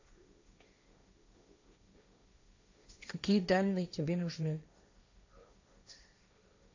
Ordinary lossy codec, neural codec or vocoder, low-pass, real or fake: AAC, 48 kbps; codec, 16 kHz, 1.1 kbps, Voila-Tokenizer; 7.2 kHz; fake